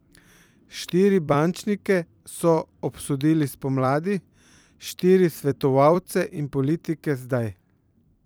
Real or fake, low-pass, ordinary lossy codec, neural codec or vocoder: fake; none; none; vocoder, 44.1 kHz, 128 mel bands every 256 samples, BigVGAN v2